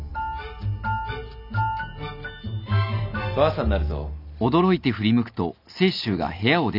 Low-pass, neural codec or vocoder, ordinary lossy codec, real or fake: 5.4 kHz; none; none; real